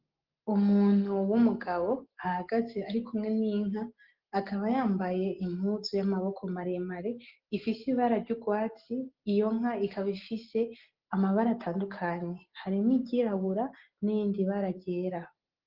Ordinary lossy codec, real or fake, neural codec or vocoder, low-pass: Opus, 16 kbps; real; none; 5.4 kHz